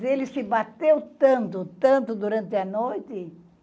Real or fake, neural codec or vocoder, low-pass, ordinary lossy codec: real; none; none; none